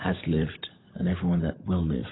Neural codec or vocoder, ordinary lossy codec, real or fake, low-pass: codec, 16 kHz, 16 kbps, FunCodec, trained on LibriTTS, 50 frames a second; AAC, 16 kbps; fake; 7.2 kHz